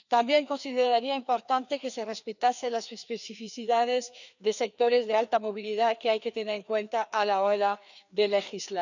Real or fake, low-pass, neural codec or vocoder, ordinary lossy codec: fake; 7.2 kHz; codec, 16 kHz, 2 kbps, FreqCodec, larger model; none